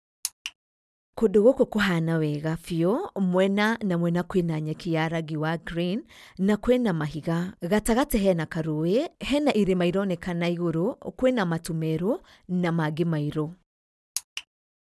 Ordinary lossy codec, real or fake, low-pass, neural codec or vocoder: none; real; none; none